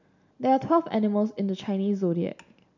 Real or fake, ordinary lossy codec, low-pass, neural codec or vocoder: real; none; 7.2 kHz; none